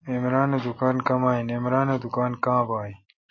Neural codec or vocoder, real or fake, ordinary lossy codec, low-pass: none; real; MP3, 32 kbps; 7.2 kHz